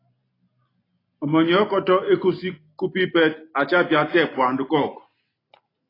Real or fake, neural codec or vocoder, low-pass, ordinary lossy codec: real; none; 5.4 kHz; AAC, 24 kbps